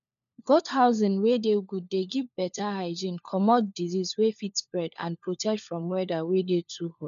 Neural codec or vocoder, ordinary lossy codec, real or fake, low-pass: codec, 16 kHz, 4 kbps, FunCodec, trained on LibriTTS, 50 frames a second; none; fake; 7.2 kHz